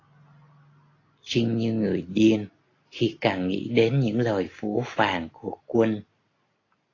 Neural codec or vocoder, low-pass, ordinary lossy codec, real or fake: none; 7.2 kHz; AAC, 32 kbps; real